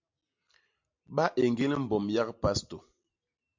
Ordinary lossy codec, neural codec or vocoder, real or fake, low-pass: MP3, 48 kbps; none; real; 7.2 kHz